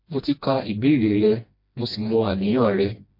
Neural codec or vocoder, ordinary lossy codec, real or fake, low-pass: codec, 16 kHz, 1 kbps, FreqCodec, smaller model; MP3, 32 kbps; fake; 5.4 kHz